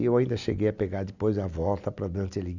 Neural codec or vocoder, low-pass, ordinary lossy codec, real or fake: none; 7.2 kHz; none; real